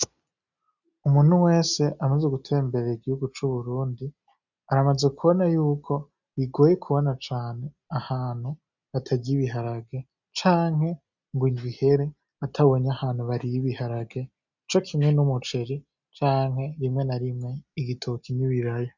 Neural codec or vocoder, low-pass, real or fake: none; 7.2 kHz; real